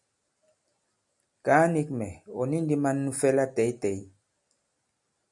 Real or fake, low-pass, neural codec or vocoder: real; 10.8 kHz; none